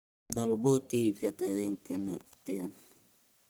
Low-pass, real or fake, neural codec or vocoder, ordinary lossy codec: none; fake; codec, 44.1 kHz, 1.7 kbps, Pupu-Codec; none